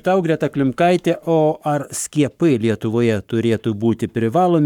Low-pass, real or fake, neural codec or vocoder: 19.8 kHz; fake; codec, 44.1 kHz, 7.8 kbps, Pupu-Codec